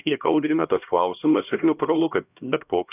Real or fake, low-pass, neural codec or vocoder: fake; 3.6 kHz; codec, 16 kHz, 1 kbps, X-Codec, HuBERT features, trained on LibriSpeech